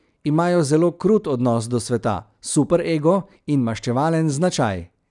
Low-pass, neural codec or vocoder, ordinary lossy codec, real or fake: 10.8 kHz; none; none; real